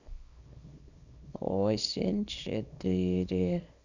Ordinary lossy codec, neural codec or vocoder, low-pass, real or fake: none; codec, 24 kHz, 0.9 kbps, WavTokenizer, small release; 7.2 kHz; fake